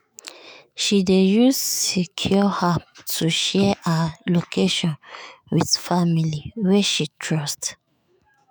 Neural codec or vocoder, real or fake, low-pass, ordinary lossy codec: autoencoder, 48 kHz, 128 numbers a frame, DAC-VAE, trained on Japanese speech; fake; none; none